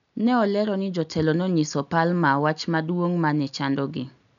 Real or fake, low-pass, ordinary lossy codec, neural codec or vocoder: real; 7.2 kHz; none; none